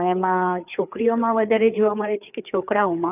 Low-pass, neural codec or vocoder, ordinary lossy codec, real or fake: 3.6 kHz; codec, 16 kHz, 4 kbps, FreqCodec, larger model; none; fake